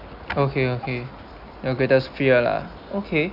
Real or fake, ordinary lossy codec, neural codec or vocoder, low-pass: real; none; none; 5.4 kHz